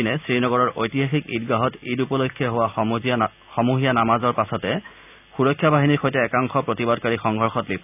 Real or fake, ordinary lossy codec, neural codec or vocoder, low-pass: real; none; none; 3.6 kHz